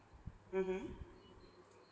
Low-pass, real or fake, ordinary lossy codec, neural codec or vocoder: none; real; none; none